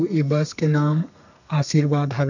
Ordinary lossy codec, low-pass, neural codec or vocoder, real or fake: none; 7.2 kHz; codec, 32 kHz, 1.9 kbps, SNAC; fake